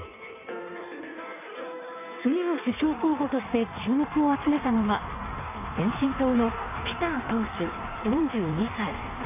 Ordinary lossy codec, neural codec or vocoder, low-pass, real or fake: none; codec, 16 kHz in and 24 kHz out, 1.1 kbps, FireRedTTS-2 codec; 3.6 kHz; fake